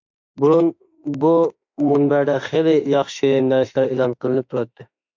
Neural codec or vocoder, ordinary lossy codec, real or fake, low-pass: autoencoder, 48 kHz, 32 numbers a frame, DAC-VAE, trained on Japanese speech; MP3, 64 kbps; fake; 7.2 kHz